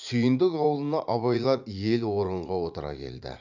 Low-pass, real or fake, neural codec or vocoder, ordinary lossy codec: 7.2 kHz; fake; vocoder, 44.1 kHz, 80 mel bands, Vocos; none